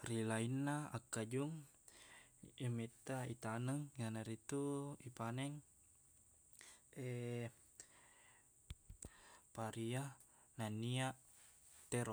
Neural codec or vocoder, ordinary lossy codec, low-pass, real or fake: none; none; none; real